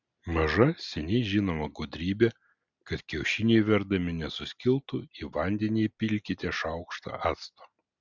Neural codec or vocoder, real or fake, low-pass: none; real; 7.2 kHz